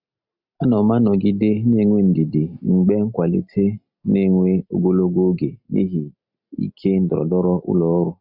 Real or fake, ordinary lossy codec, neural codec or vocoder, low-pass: real; none; none; 5.4 kHz